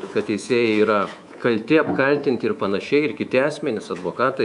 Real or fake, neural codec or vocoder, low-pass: fake; codec, 24 kHz, 3.1 kbps, DualCodec; 10.8 kHz